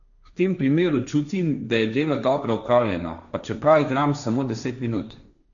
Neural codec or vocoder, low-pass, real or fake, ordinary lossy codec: codec, 16 kHz, 1.1 kbps, Voila-Tokenizer; 7.2 kHz; fake; none